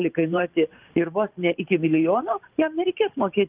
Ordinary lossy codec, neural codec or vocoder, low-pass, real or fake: Opus, 24 kbps; vocoder, 44.1 kHz, 128 mel bands every 512 samples, BigVGAN v2; 3.6 kHz; fake